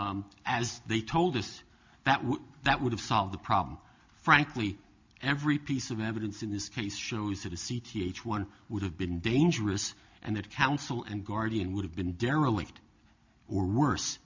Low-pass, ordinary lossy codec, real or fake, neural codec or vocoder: 7.2 kHz; MP3, 48 kbps; real; none